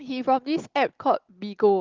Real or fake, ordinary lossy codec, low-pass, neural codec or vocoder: real; Opus, 16 kbps; 7.2 kHz; none